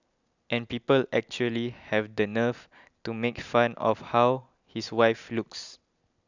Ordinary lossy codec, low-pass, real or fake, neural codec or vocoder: none; 7.2 kHz; real; none